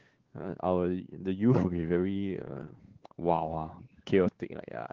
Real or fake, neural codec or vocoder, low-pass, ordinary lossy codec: fake; codec, 16 kHz, 4 kbps, X-Codec, WavLM features, trained on Multilingual LibriSpeech; 7.2 kHz; Opus, 16 kbps